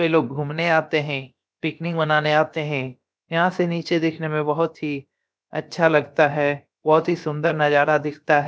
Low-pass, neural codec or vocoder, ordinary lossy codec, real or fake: none; codec, 16 kHz, about 1 kbps, DyCAST, with the encoder's durations; none; fake